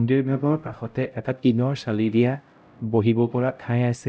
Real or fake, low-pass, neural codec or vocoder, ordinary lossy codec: fake; none; codec, 16 kHz, 0.5 kbps, X-Codec, HuBERT features, trained on LibriSpeech; none